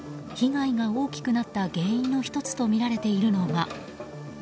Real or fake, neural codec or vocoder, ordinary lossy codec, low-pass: real; none; none; none